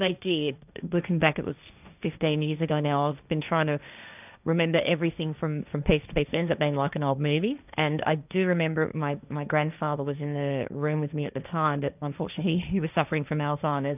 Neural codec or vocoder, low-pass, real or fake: codec, 16 kHz, 1.1 kbps, Voila-Tokenizer; 3.6 kHz; fake